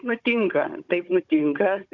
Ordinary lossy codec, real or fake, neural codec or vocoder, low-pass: Opus, 64 kbps; fake; codec, 16 kHz, 16 kbps, FunCodec, trained on Chinese and English, 50 frames a second; 7.2 kHz